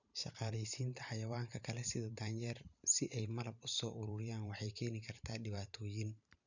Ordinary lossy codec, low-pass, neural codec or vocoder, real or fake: none; 7.2 kHz; vocoder, 44.1 kHz, 128 mel bands every 512 samples, BigVGAN v2; fake